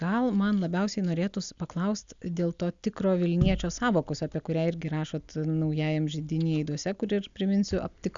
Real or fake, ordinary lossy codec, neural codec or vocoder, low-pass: real; Opus, 64 kbps; none; 7.2 kHz